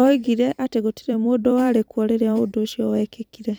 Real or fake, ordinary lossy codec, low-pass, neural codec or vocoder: fake; none; none; vocoder, 44.1 kHz, 128 mel bands every 256 samples, BigVGAN v2